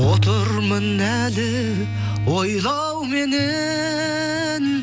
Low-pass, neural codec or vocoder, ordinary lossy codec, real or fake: none; none; none; real